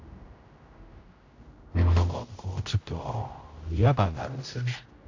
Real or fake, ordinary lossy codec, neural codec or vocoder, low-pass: fake; AAC, 48 kbps; codec, 16 kHz, 0.5 kbps, X-Codec, HuBERT features, trained on general audio; 7.2 kHz